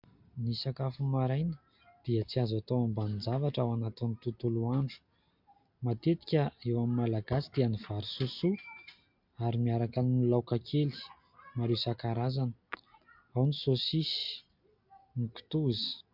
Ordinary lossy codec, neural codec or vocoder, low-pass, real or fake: MP3, 48 kbps; none; 5.4 kHz; real